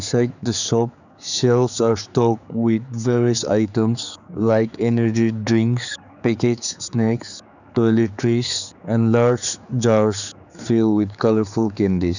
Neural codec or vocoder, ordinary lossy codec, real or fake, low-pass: codec, 16 kHz, 4 kbps, X-Codec, HuBERT features, trained on general audio; none; fake; 7.2 kHz